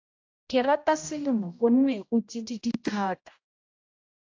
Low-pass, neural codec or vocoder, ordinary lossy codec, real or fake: 7.2 kHz; codec, 16 kHz, 0.5 kbps, X-Codec, HuBERT features, trained on general audio; AAC, 48 kbps; fake